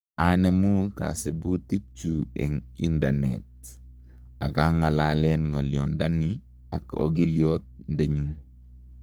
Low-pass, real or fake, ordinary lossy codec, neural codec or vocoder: none; fake; none; codec, 44.1 kHz, 3.4 kbps, Pupu-Codec